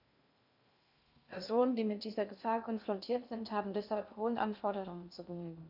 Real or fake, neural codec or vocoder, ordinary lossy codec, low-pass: fake; codec, 16 kHz in and 24 kHz out, 0.6 kbps, FocalCodec, streaming, 2048 codes; none; 5.4 kHz